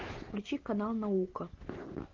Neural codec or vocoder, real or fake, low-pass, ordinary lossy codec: none; real; 7.2 kHz; Opus, 16 kbps